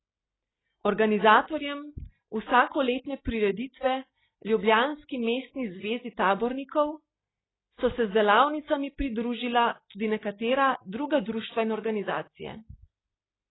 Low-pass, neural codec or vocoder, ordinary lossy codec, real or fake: 7.2 kHz; none; AAC, 16 kbps; real